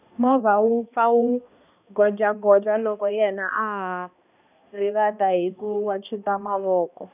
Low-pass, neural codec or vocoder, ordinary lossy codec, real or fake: 3.6 kHz; codec, 16 kHz, 1 kbps, X-Codec, HuBERT features, trained on balanced general audio; none; fake